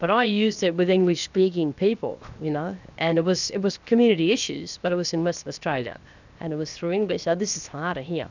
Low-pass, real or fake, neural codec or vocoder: 7.2 kHz; fake; codec, 16 kHz, 0.7 kbps, FocalCodec